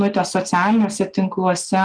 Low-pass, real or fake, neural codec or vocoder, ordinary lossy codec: 9.9 kHz; real; none; Opus, 32 kbps